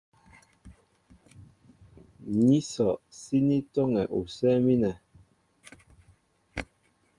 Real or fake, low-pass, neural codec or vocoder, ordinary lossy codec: real; 10.8 kHz; none; Opus, 32 kbps